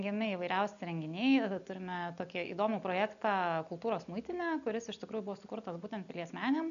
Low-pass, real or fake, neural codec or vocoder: 7.2 kHz; real; none